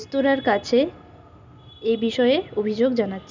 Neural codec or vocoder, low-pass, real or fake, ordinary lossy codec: none; 7.2 kHz; real; none